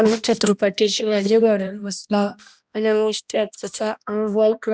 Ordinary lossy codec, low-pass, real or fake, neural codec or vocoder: none; none; fake; codec, 16 kHz, 1 kbps, X-Codec, HuBERT features, trained on balanced general audio